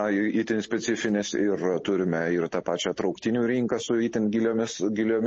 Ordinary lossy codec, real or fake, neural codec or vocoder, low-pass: MP3, 32 kbps; real; none; 10.8 kHz